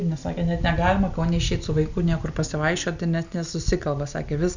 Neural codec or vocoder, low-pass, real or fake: none; 7.2 kHz; real